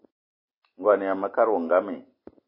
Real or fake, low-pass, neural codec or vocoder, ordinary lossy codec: real; 5.4 kHz; none; MP3, 24 kbps